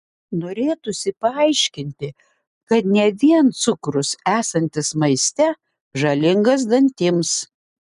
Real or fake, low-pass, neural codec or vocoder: real; 10.8 kHz; none